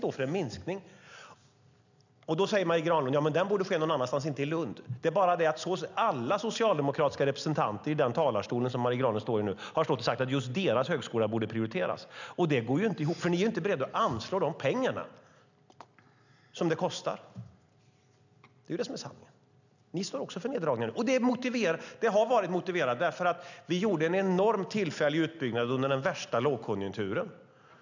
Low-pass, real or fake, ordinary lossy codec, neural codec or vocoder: 7.2 kHz; real; none; none